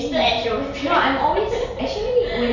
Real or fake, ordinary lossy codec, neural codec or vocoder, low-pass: real; none; none; 7.2 kHz